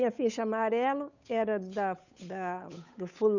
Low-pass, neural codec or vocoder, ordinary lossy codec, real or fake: 7.2 kHz; codec, 16 kHz, 8 kbps, FunCodec, trained on Chinese and English, 25 frames a second; none; fake